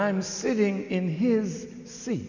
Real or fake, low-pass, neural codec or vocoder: real; 7.2 kHz; none